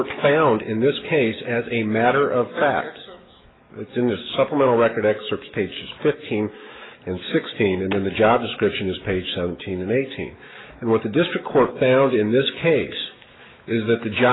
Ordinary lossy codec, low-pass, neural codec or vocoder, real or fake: AAC, 16 kbps; 7.2 kHz; autoencoder, 48 kHz, 128 numbers a frame, DAC-VAE, trained on Japanese speech; fake